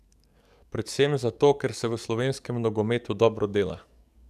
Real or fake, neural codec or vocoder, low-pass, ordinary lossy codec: fake; codec, 44.1 kHz, 7.8 kbps, DAC; 14.4 kHz; none